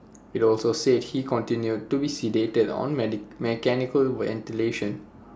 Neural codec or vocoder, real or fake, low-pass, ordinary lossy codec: none; real; none; none